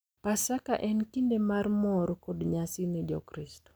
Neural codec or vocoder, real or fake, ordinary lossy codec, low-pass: none; real; none; none